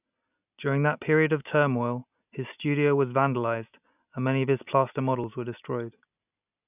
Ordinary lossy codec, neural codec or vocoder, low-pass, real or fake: none; none; 3.6 kHz; real